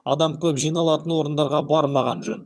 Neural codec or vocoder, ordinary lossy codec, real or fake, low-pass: vocoder, 22.05 kHz, 80 mel bands, HiFi-GAN; none; fake; none